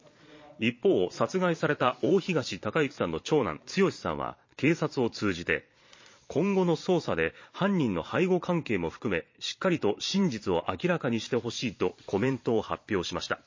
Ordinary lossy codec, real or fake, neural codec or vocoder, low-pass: MP3, 32 kbps; fake; vocoder, 22.05 kHz, 80 mel bands, Vocos; 7.2 kHz